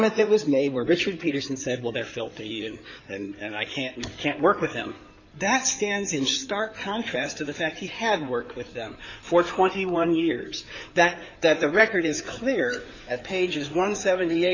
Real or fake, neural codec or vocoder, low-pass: fake; codec, 16 kHz in and 24 kHz out, 2.2 kbps, FireRedTTS-2 codec; 7.2 kHz